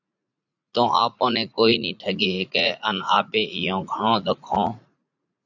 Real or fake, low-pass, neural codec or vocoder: fake; 7.2 kHz; vocoder, 44.1 kHz, 80 mel bands, Vocos